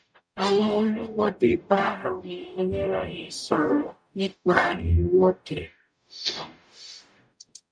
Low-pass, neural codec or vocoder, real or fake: 9.9 kHz; codec, 44.1 kHz, 0.9 kbps, DAC; fake